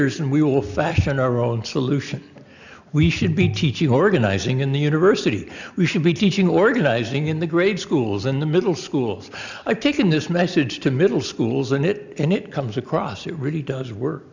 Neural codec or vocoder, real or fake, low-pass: none; real; 7.2 kHz